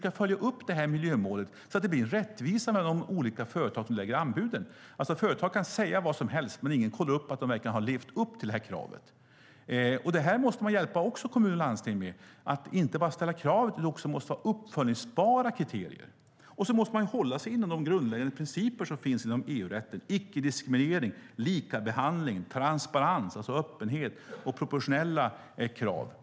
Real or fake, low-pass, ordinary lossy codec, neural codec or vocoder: real; none; none; none